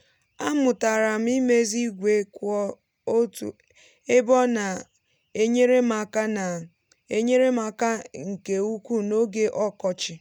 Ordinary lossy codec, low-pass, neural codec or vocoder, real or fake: none; 19.8 kHz; none; real